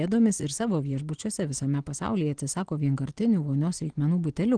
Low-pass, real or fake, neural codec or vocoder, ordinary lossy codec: 9.9 kHz; real; none; Opus, 16 kbps